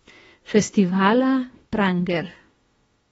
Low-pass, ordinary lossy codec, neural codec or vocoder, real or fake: 19.8 kHz; AAC, 24 kbps; autoencoder, 48 kHz, 32 numbers a frame, DAC-VAE, trained on Japanese speech; fake